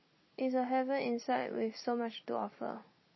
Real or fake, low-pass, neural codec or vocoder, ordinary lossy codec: real; 7.2 kHz; none; MP3, 24 kbps